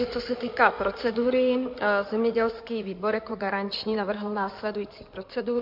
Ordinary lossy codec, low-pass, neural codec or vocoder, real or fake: MP3, 48 kbps; 5.4 kHz; codec, 16 kHz in and 24 kHz out, 2.2 kbps, FireRedTTS-2 codec; fake